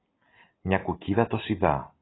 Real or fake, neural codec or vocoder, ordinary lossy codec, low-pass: real; none; AAC, 16 kbps; 7.2 kHz